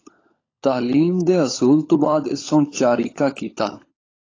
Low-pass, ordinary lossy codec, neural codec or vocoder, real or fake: 7.2 kHz; AAC, 32 kbps; codec, 16 kHz, 16 kbps, FunCodec, trained on LibriTTS, 50 frames a second; fake